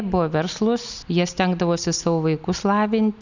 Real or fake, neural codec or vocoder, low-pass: real; none; 7.2 kHz